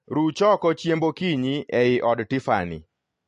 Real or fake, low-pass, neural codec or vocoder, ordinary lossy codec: real; 10.8 kHz; none; MP3, 48 kbps